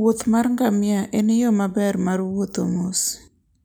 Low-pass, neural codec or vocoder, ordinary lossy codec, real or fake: none; none; none; real